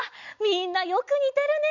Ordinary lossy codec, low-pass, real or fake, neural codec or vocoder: none; 7.2 kHz; real; none